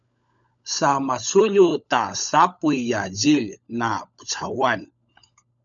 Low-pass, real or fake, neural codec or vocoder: 7.2 kHz; fake; codec, 16 kHz, 16 kbps, FunCodec, trained on LibriTTS, 50 frames a second